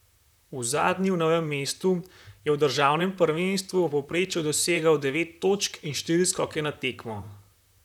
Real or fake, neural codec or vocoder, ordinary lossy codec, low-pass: fake; vocoder, 44.1 kHz, 128 mel bands, Pupu-Vocoder; none; 19.8 kHz